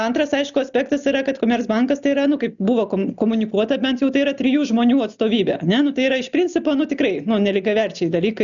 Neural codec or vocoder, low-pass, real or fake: none; 7.2 kHz; real